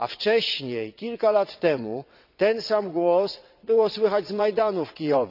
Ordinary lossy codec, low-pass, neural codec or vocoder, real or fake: none; 5.4 kHz; vocoder, 22.05 kHz, 80 mel bands, WaveNeXt; fake